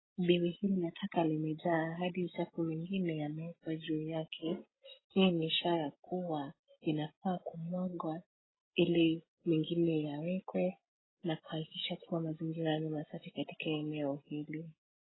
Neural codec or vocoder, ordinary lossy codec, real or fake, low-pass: none; AAC, 16 kbps; real; 7.2 kHz